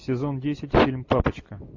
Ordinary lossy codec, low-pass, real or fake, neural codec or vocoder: AAC, 48 kbps; 7.2 kHz; real; none